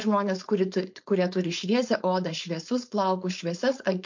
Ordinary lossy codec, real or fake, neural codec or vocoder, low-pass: MP3, 48 kbps; fake; codec, 16 kHz, 4.8 kbps, FACodec; 7.2 kHz